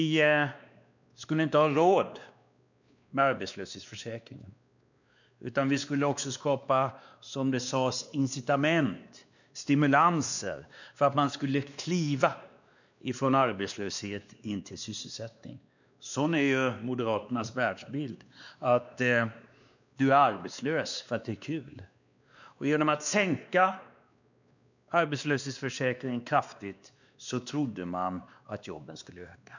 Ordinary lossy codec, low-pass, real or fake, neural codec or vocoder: none; 7.2 kHz; fake; codec, 16 kHz, 2 kbps, X-Codec, WavLM features, trained on Multilingual LibriSpeech